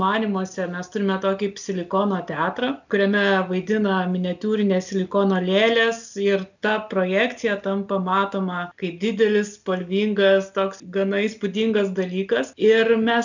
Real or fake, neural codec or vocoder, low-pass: real; none; 7.2 kHz